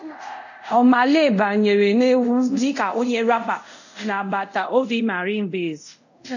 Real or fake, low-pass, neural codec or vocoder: fake; 7.2 kHz; codec, 24 kHz, 0.5 kbps, DualCodec